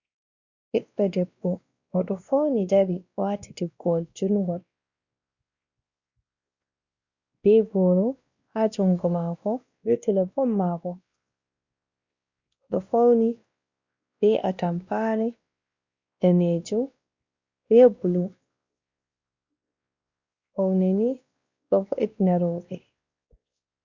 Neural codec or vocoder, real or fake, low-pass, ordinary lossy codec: codec, 16 kHz, 1 kbps, X-Codec, WavLM features, trained on Multilingual LibriSpeech; fake; 7.2 kHz; Opus, 64 kbps